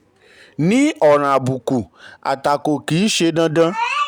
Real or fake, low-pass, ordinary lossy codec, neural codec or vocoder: real; 19.8 kHz; none; none